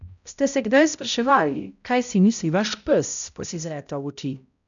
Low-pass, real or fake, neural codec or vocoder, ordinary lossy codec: 7.2 kHz; fake; codec, 16 kHz, 0.5 kbps, X-Codec, HuBERT features, trained on balanced general audio; none